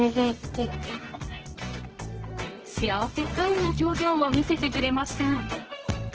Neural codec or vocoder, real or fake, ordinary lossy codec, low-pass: codec, 24 kHz, 0.9 kbps, WavTokenizer, medium music audio release; fake; Opus, 16 kbps; 7.2 kHz